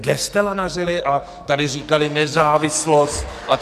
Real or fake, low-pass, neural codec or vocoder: fake; 14.4 kHz; codec, 44.1 kHz, 2.6 kbps, SNAC